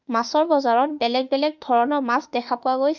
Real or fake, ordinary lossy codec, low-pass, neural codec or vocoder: fake; none; 7.2 kHz; autoencoder, 48 kHz, 32 numbers a frame, DAC-VAE, trained on Japanese speech